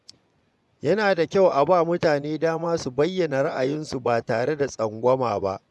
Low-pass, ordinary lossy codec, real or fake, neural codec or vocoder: none; none; fake; vocoder, 24 kHz, 100 mel bands, Vocos